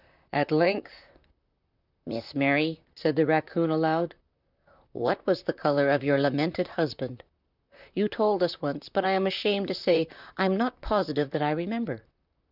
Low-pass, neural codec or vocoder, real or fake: 5.4 kHz; vocoder, 44.1 kHz, 128 mel bands, Pupu-Vocoder; fake